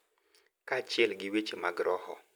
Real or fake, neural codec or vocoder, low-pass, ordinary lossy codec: real; none; none; none